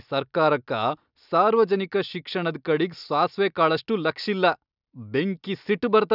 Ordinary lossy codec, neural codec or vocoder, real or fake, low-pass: none; none; real; 5.4 kHz